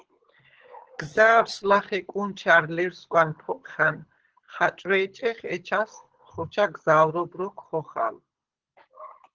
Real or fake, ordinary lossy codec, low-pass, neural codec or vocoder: fake; Opus, 16 kbps; 7.2 kHz; codec, 24 kHz, 6 kbps, HILCodec